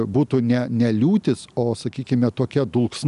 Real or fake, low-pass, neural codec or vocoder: real; 10.8 kHz; none